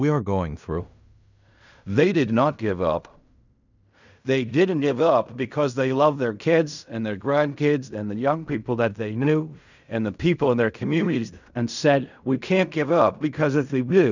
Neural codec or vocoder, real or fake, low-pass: codec, 16 kHz in and 24 kHz out, 0.4 kbps, LongCat-Audio-Codec, fine tuned four codebook decoder; fake; 7.2 kHz